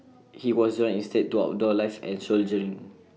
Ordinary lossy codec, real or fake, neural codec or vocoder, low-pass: none; real; none; none